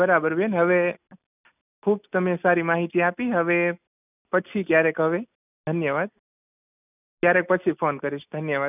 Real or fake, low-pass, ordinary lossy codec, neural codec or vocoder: real; 3.6 kHz; none; none